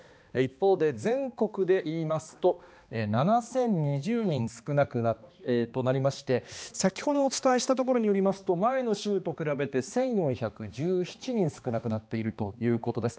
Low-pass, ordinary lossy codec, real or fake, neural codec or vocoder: none; none; fake; codec, 16 kHz, 2 kbps, X-Codec, HuBERT features, trained on balanced general audio